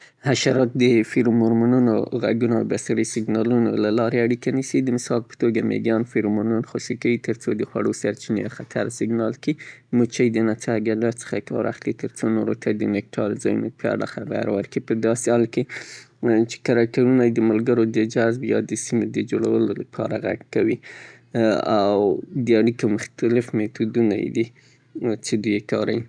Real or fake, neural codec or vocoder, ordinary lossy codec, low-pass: real; none; none; 9.9 kHz